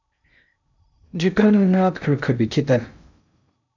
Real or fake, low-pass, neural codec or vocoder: fake; 7.2 kHz; codec, 16 kHz in and 24 kHz out, 0.6 kbps, FocalCodec, streaming, 2048 codes